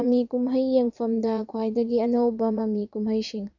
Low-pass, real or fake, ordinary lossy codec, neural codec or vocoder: 7.2 kHz; fake; none; vocoder, 22.05 kHz, 80 mel bands, Vocos